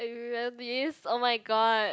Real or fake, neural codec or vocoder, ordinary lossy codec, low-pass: real; none; none; none